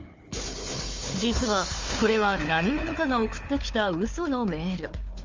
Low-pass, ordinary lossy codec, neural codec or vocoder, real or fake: 7.2 kHz; Opus, 32 kbps; codec, 16 kHz, 4 kbps, FunCodec, trained on Chinese and English, 50 frames a second; fake